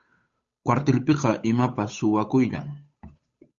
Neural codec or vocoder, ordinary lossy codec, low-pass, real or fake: codec, 16 kHz, 8 kbps, FunCodec, trained on Chinese and English, 25 frames a second; Opus, 64 kbps; 7.2 kHz; fake